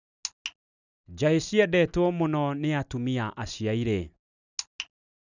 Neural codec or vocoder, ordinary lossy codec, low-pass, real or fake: none; none; 7.2 kHz; real